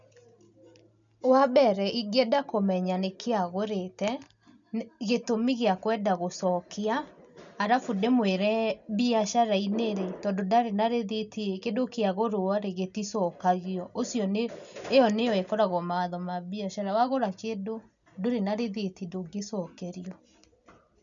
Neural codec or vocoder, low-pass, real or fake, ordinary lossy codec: none; 7.2 kHz; real; none